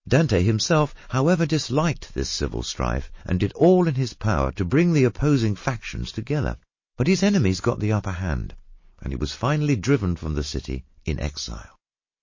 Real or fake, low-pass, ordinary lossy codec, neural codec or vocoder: real; 7.2 kHz; MP3, 32 kbps; none